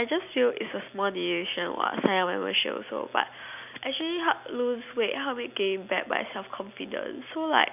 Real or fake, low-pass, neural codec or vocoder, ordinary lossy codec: real; 3.6 kHz; none; none